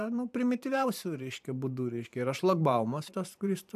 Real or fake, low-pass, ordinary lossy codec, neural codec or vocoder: fake; 14.4 kHz; AAC, 96 kbps; vocoder, 44.1 kHz, 128 mel bands every 512 samples, BigVGAN v2